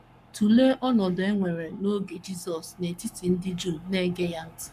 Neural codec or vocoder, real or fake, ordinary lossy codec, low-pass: codec, 44.1 kHz, 7.8 kbps, Pupu-Codec; fake; none; 14.4 kHz